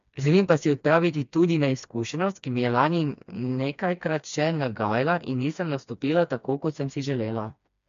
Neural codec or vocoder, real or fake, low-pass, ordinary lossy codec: codec, 16 kHz, 2 kbps, FreqCodec, smaller model; fake; 7.2 kHz; MP3, 64 kbps